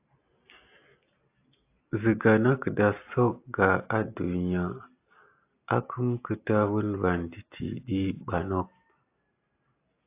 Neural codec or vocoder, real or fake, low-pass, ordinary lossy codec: none; real; 3.6 kHz; AAC, 24 kbps